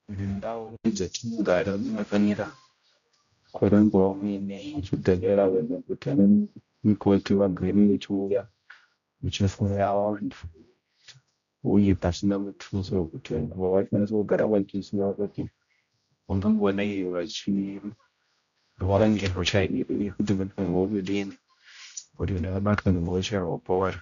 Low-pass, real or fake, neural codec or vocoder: 7.2 kHz; fake; codec, 16 kHz, 0.5 kbps, X-Codec, HuBERT features, trained on general audio